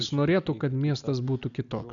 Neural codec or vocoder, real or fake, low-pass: none; real; 7.2 kHz